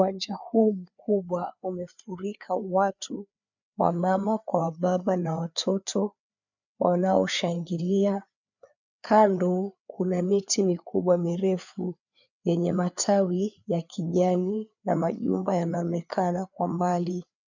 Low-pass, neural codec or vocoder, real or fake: 7.2 kHz; codec, 16 kHz, 4 kbps, FreqCodec, larger model; fake